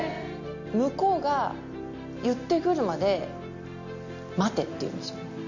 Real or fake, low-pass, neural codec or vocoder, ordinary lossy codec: real; 7.2 kHz; none; none